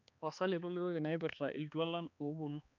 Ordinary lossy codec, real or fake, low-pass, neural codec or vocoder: none; fake; 7.2 kHz; codec, 16 kHz, 2 kbps, X-Codec, HuBERT features, trained on balanced general audio